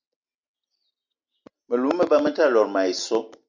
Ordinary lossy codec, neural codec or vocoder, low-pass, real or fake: MP3, 64 kbps; none; 7.2 kHz; real